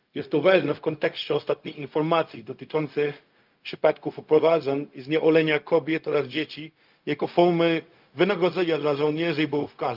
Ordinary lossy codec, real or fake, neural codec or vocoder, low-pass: Opus, 24 kbps; fake; codec, 16 kHz, 0.4 kbps, LongCat-Audio-Codec; 5.4 kHz